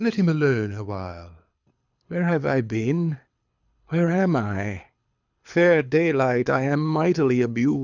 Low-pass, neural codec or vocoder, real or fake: 7.2 kHz; codec, 24 kHz, 6 kbps, HILCodec; fake